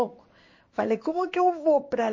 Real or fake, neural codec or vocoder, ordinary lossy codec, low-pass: fake; codec, 16 kHz in and 24 kHz out, 1 kbps, XY-Tokenizer; MP3, 32 kbps; 7.2 kHz